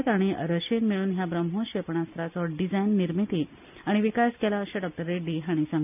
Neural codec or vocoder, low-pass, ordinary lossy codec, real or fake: none; 3.6 kHz; none; real